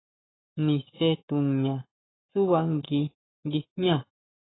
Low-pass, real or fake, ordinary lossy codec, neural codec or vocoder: 7.2 kHz; fake; AAC, 16 kbps; codec, 44.1 kHz, 7.8 kbps, Pupu-Codec